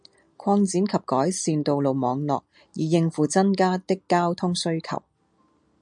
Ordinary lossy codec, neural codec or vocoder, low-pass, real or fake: MP3, 64 kbps; none; 9.9 kHz; real